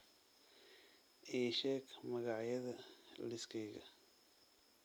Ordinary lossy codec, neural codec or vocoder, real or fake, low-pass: none; none; real; none